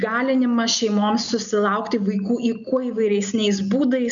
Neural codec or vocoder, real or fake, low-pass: none; real; 7.2 kHz